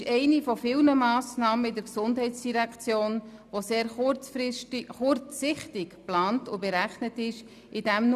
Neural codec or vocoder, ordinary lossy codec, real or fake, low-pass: none; none; real; 14.4 kHz